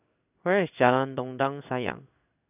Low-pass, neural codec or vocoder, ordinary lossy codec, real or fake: 3.6 kHz; codec, 16 kHz in and 24 kHz out, 1 kbps, XY-Tokenizer; none; fake